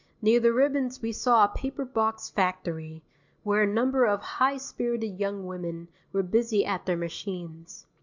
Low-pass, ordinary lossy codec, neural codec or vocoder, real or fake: 7.2 kHz; MP3, 64 kbps; none; real